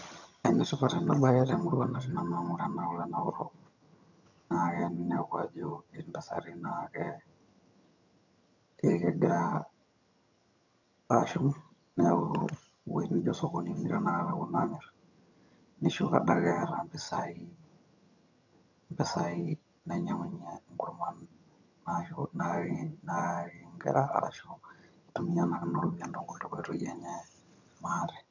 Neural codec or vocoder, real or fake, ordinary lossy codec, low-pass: vocoder, 22.05 kHz, 80 mel bands, HiFi-GAN; fake; none; 7.2 kHz